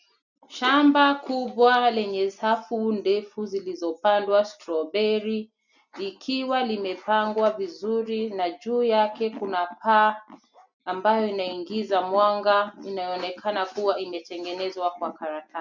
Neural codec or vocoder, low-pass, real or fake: none; 7.2 kHz; real